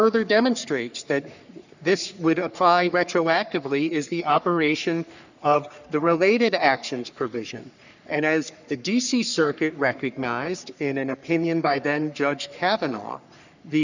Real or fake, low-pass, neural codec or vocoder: fake; 7.2 kHz; codec, 44.1 kHz, 3.4 kbps, Pupu-Codec